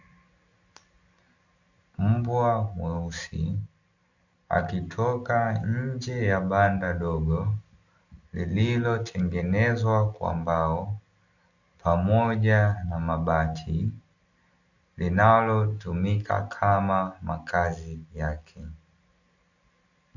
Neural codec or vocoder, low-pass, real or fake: none; 7.2 kHz; real